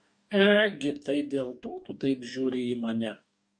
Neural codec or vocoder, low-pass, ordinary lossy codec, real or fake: codec, 44.1 kHz, 2.6 kbps, DAC; 9.9 kHz; MP3, 64 kbps; fake